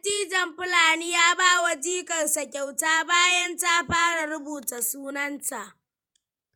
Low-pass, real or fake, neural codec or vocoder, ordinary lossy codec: none; fake; vocoder, 48 kHz, 128 mel bands, Vocos; none